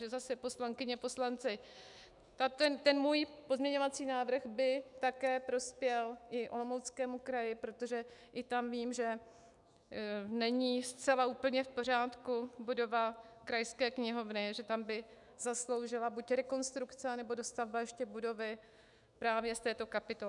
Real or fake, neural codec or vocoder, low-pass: fake; autoencoder, 48 kHz, 128 numbers a frame, DAC-VAE, trained on Japanese speech; 10.8 kHz